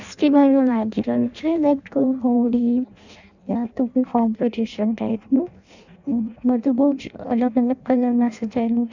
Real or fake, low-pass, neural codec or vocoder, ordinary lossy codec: fake; 7.2 kHz; codec, 16 kHz in and 24 kHz out, 0.6 kbps, FireRedTTS-2 codec; none